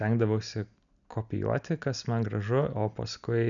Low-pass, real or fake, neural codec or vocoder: 7.2 kHz; real; none